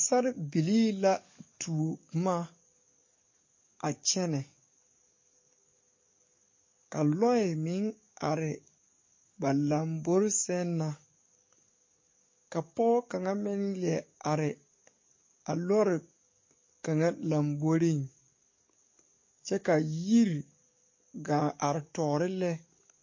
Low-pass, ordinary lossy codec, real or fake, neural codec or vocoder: 7.2 kHz; MP3, 32 kbps; fake; codec, 44.1 kHz, 7.8 kbps, DAC